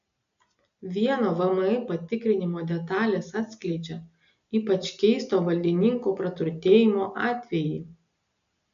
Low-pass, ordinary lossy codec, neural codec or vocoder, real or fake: 7.2 kHz; AAC, 96 kbps; none; real